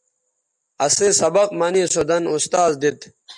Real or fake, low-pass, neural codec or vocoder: real; 10.8 kHz; none